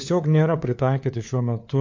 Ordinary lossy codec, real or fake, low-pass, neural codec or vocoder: MP3, 48 kbps; fake; 7.2 kHz; codec, 16 kHz, 4 kbps, FunCodec, trained on LibriTTS, 50 frames a second